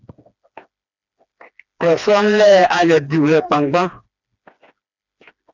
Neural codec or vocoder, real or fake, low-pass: codec, 16 kHz, 2 kbps, FreqCodec, smaller model; fake; 7.2 kHz